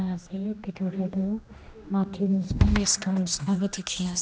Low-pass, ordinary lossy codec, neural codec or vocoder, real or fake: none; none; codec, 16 kHz, 1 kbps, X-Codec, HuBERT features, trained on general audio; fake